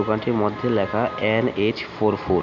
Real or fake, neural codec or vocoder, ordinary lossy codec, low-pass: real; none; MP3, 64 kbps; 7.2 kHz